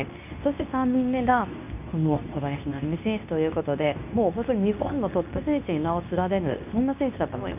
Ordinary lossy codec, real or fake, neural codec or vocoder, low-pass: none; fake; codec, 24 kHz, 0.9 kbps, WavTokenizer, medium speech release version 2; 3.6 kHz